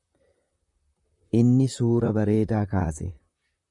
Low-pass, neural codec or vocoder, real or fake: 10.8 kHz; vocoder, 44.1 kHz, 128 mel bands, Pupu-Vocoder; fake